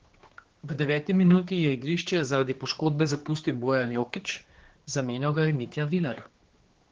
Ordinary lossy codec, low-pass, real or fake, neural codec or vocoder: Opus, 16 kbps; 7.2 kHz; fake; codec, 16 kHz, 2 kbps, X-Codec, HuBERT features, trained on general audio